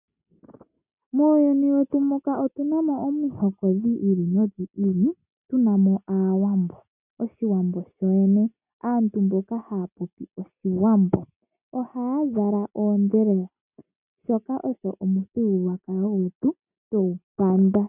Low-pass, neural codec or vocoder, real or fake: 3.6 kHz; none; real